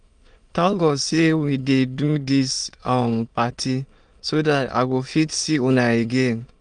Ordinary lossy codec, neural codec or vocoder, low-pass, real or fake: Opus, 32 kbps; autoencoder, 22.05 kHz, a latent of 192 numbers a frame, VITS, trained on many speakers; 9.9 kHz; fake